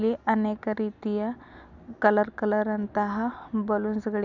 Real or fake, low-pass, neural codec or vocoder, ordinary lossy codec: real; 7.2 kHz; none; none